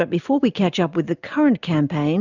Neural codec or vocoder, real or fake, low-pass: none; real; 7.2 kHz